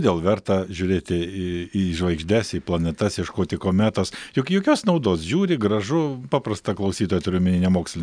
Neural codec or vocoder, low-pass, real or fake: none; 9.9 kHz; real